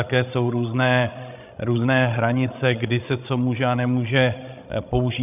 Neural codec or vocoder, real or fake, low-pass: codec, 16 kHz, 16 kbps, FreqCodec, larger model; fake; 3.6 kHz